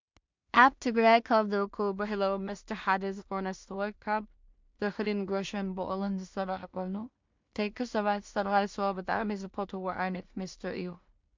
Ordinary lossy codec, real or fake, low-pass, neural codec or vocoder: MP3, 64 kbps; fake; 7.2 kHz; codec, 16 kHz in and 24 kHz out, 0.4 kbps, LongCat-Audio-Codec, two codebook decoder